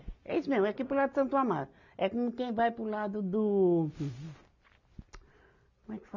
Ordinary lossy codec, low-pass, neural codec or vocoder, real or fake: none; 7.2 kHz; none; real